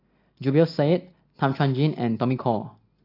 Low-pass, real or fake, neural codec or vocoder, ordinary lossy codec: 5.4 kHz; real; none; AAC, 32 kbps